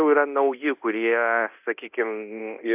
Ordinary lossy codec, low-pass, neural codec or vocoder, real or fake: AAC, 32 kbps; 3.6 kHz; codec, 24 kHz, 0.9 kbps, DualCodec; fake